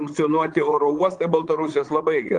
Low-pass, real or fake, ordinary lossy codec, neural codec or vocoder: 9.9 kHz; fake; Opus, 24 kbps; vocoder, 22.05 kHz, 80 mel bands, Vocos